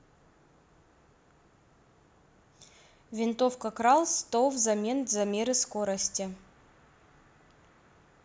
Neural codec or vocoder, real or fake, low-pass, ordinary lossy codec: none; real; none; none